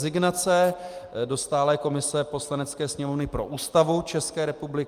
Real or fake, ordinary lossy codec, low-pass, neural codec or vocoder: real; Opus, 32 kbps; 14.4 kHz; none